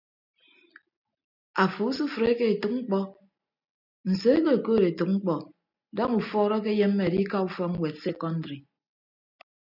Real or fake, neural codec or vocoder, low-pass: real; none; 5.4 kHz